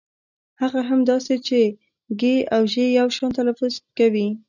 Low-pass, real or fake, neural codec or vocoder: 7.2 kHz; real; none